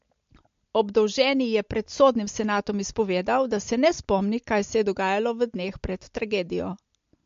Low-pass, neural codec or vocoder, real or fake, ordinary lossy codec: 7.2 kHz; none; real; MP3, 48 kbps